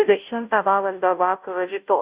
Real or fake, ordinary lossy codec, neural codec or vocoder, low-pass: fake; Opus, 64 kbps; codec, 16 kHz, 0.5 kbps, FunCodec, trained on Chinese and English, 25 frames a second; 3.6 kHz